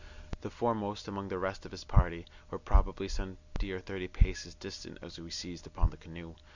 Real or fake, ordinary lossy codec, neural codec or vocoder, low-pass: real; Opus, 64 kbps; none; 7.2 kHz